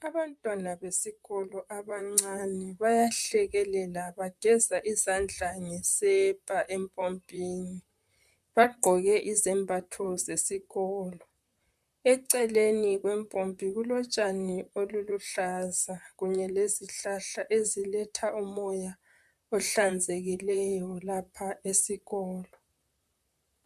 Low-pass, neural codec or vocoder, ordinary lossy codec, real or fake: 14.4 kHz; vocoder, 44.1 kHz, 128 mel bands, Pupu-Vocoder; MP3, 96 kbps; fake